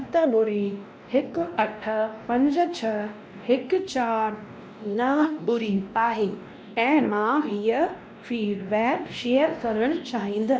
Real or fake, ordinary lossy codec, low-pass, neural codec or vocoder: fake; none; none; codec, 16 kHz, 1 kbps, X-Codec, WavLM features, trained on Multilingual LibriSpeech